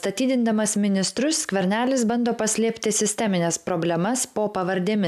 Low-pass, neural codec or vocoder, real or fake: 14.4 kHz; none; real